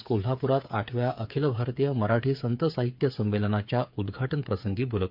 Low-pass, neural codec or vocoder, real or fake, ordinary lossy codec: 5.4 kHz; codec, 16 kHz, 8 kbps, FreqCodec, smaller model; fake; MP3, 48 kbps